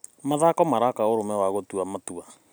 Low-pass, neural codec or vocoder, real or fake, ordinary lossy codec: none; none; real; none